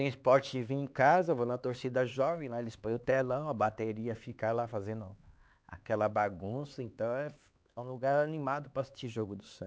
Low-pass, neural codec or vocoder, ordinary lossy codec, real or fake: none; codec, 16 kHz, 4 kbps, X-Codec, HuBERT features, trained on LibriSpeech; none; fake